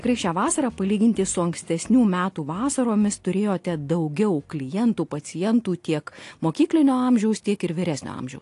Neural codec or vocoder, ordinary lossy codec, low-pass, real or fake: none; AAC, 48 kbps; 10.8 kHz; real